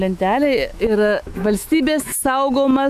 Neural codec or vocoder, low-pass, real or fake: autoencoder, 48 kHz, 128 numbers a frame, DAC-VAE, trained on Japanese speech; 14.4 kHz; fake